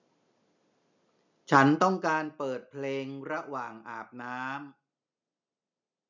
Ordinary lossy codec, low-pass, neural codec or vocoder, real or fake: none; 7.2 kHz; none; real